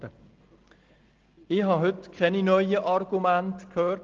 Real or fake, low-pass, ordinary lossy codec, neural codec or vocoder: real; 7.2 kHz; Opus, 16 kbps; none